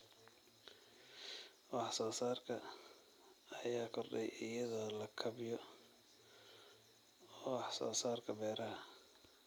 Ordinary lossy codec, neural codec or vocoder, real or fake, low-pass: none; none; real; none